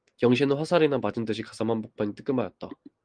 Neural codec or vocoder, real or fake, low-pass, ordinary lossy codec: none; real; 9.9 kHz; Opus, 24 kbps